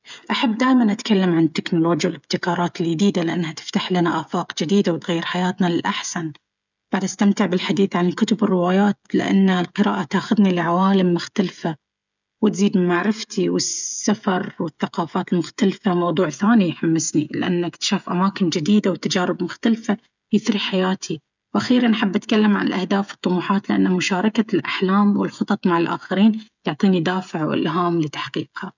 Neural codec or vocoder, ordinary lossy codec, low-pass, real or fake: codec, 16 kHz, 16 kbps, FreqCodec, smaller model; none; 7.2 kHz; fake